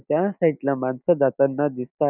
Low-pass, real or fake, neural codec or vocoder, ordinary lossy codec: 3.6 kHz; real; none; none